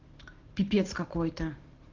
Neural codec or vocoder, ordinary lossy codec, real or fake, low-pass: vocoder, 44.1 kHz, 128 mel bands every 512 samples, BigVGAN v2; Opus, 16 kbps; fake; 7.2 kHz